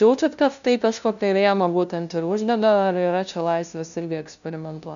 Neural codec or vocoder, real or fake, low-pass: codec, 16 kHz, 0.5 kbps, FunCodec, trained on LibriTTS, 25 frames a second; fake; 7.2 kHz